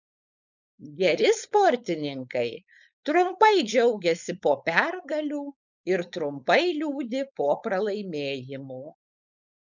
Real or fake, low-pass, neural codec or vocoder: fake; 7.2 kHz; codec, 16 kHz, 4.8 kbps, FACodec